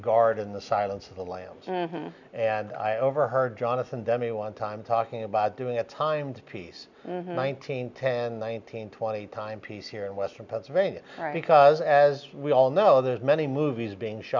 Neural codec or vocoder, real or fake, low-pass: none; real; 7.2 kHz